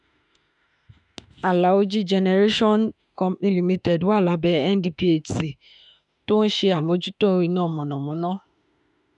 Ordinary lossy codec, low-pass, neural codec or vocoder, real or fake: none; 10.8 kHz; autoencoder, 48 kHz, 32 numbers a frame, DAC-VAE, trained on Japanese speech; fake